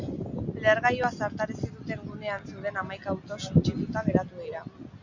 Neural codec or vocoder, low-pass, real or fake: none; 7.2 kHz; real